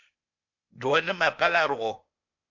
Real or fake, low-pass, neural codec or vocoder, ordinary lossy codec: fake; 7.2 kHz; codec, 16 kHz, 0.8 kbps, ZipCodec; MP3, 48 kbps